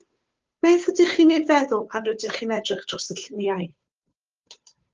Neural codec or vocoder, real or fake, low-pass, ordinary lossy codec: codec, 16 kHz, 2 kbps, FunCodec, trained on Chinese and English, 25 frames a second; fake; 7.2 kHz; Opus, 32 kbps